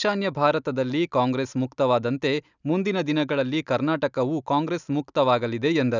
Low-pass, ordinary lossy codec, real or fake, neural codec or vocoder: 7.2 kHz; none; real; none